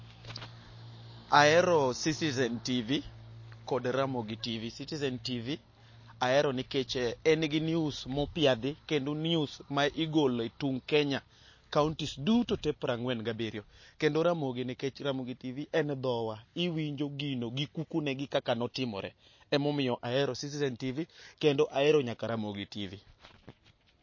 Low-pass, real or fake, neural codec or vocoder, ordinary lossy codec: 7.2 kHz; real; none; MP3, 32 kbps